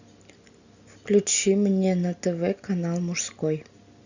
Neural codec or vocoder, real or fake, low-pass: none; real; 7.2 kHz